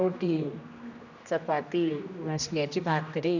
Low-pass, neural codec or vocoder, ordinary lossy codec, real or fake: 7.2 kHz; codec, 16 kHz, 1 kbps, X-Codec, HuBERT features, trained on general audio; none; fake